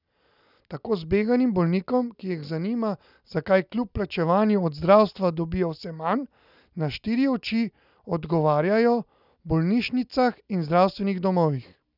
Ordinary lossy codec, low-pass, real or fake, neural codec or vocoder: none; 5.4 kHz; real; none